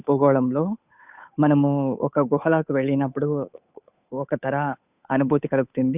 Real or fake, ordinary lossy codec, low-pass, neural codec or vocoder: fake; none; 3.6 kHz; codec, 16 kHz, 8 kbps, FunCodec, trained on Chinese and English, 25 frames a second